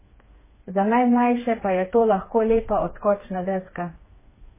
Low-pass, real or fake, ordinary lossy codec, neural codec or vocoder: 3.6 kHz; fake; MP3, 16 kbps; codec, 16 kHz, 4 kbps, FreqCodec, smaller model